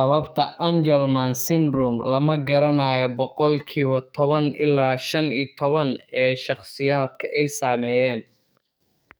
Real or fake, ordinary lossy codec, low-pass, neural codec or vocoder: fake; none; none; codec, 44.1 kHz, 2.6 kbps, SNAC